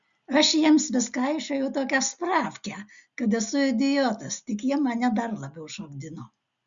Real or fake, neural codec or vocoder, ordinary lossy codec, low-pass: real; none; Opus, 64 kbps; 7.2 kHz